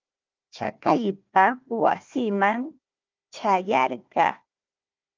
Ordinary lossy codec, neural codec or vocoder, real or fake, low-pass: Opus, 24 kbps; codec, 16 kHz, 1 kbps, FunCodec, trained on Chinese and English, 50 frames a second; fake; 7.2 kHz